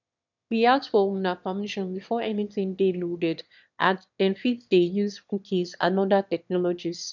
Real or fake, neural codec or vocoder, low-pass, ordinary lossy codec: fake; autoencoder, 22.05 kHz, a latent of 192 numbers a frame, VITS, trained on one speaker; 7.2 kHz; none